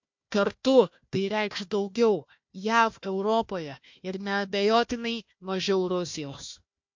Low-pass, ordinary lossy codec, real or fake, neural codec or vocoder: 7.2 kHz; MP3, 48 kbps; fake; codec, 16 kHz, 1 kbps, FunCodec, trained on Chinese and English, 50 frames a second